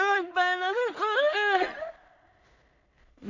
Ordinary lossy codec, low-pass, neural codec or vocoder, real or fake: none; 7.2 kHz; codec, 16 kHz in and 24 kHz out, 0.4 kbps, LongCat-Audio-Codec, two codebook decoder; fake